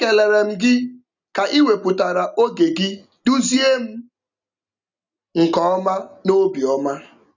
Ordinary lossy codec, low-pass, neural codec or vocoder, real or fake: none; 7.2 kHz; none; real